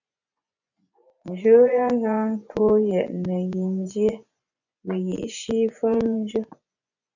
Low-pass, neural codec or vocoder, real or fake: 7.2 kHz; vocoder, 24 kHz, 100 mel bands, Vocos; fake